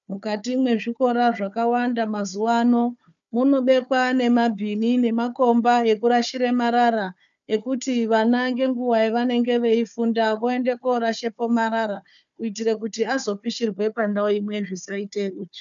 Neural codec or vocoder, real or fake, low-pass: codec, 16 kHz, 4 kbps, FunCodec, trained on Chinese and English, 50 frames a second; fake; 7.2 kHz